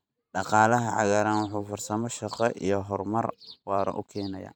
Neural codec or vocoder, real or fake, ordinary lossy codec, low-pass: vocoder, 44.1 kHz, 128 mel bands every 512 samples, BigVGAN v2; fake; none; none